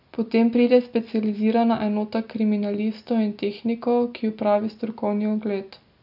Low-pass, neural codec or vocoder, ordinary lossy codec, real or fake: 5.4 kHz; none; none; real